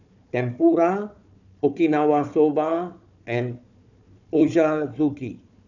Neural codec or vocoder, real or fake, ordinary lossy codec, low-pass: codec, 16 kHz, 4 kbps, FunCodec, trained on Chinese and English, 50 frames a second; fake; none; 7.2 kHz